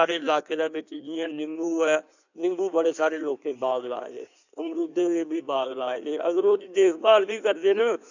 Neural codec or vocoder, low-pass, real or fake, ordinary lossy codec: codec, 16 kHz in and 24 kHz out, 1.1 kbps, FireRedTTS-2 codec; 7.2 kHz; fake; none